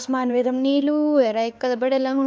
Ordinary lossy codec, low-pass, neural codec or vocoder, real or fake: none; none; codec, 16 kHz, 4 kbps, X-Codec, HuBERT features, trained on LibriSpeech; fake